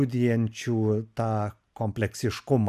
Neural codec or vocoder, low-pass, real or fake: none; 14.4 kHz; real